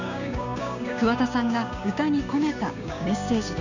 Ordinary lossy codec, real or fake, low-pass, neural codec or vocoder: none; fake; 7.2 kHz; codec, 16 kHz, 6 kbps, DAC